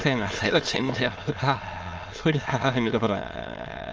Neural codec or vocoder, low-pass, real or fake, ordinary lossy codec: autoencoder, 22.05 kHz, a latent of 192 numbers a frame, VITS, trained on many speakers; 7.2 kHz; fake; Opus, 24 kbps